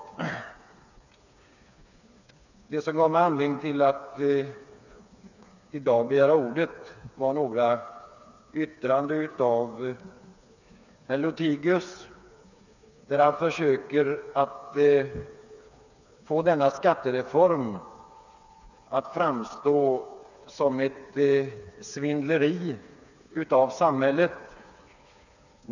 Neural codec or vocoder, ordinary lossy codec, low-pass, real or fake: codec, 16 kHz, 4 kbps, FreqCodec, smaller model; none; 7.2 kHz; fake